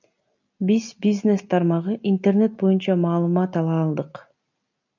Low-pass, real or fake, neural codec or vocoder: 7.2 kHz; real; none